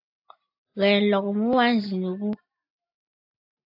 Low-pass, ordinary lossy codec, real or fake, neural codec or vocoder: 5.4 kHz; AAC, 32 kbps; real; none